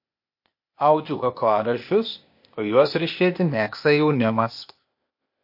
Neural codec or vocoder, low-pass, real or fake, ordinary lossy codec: codec, 16 kHz, 0.8 kbps, ZipCodec; 5.4 kHz; fake; MP3, 32 kbps